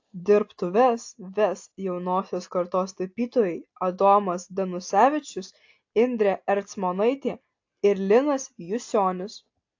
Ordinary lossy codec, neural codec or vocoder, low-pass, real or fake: AAC, 48 kbps; none; 7.2 kHz; real